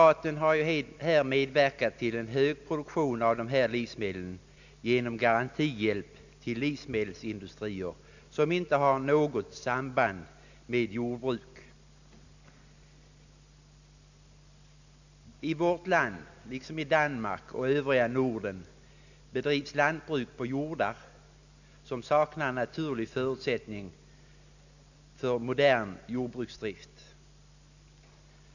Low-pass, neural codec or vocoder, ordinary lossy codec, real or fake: 7.2 kHz; none; none; real